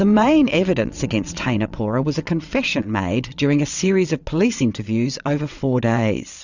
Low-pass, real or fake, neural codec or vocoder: 7.2 kHz; fake; vocoder, 22.05 kHz, 80 mel bands, WaveNeXt